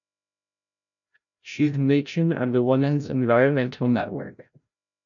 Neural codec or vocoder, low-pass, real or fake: codec, 16 kHz, 0.5 kbps, FreqCodec, larger model; 7.2 kHz; fake